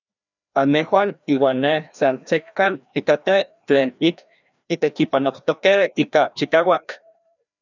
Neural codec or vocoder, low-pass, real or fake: codec, 16 kHz, 1 kbps, FreqCodec, larger model; 7.2 kHz; fake